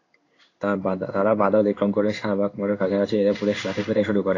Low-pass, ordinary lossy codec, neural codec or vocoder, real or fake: 7.2 kHz; AAC, 32 kbps; codec, 16 kHz in and 24 kHz out, 1 kbps, XY-Tokenizer; fake